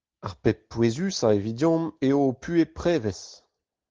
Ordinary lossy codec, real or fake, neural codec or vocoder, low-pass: Opus, 16 kbps; real; none; 7.2 kHz